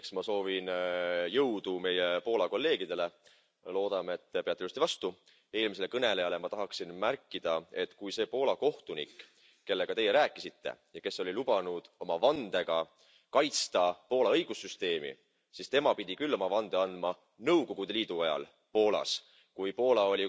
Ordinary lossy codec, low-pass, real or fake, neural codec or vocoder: none; none; real; none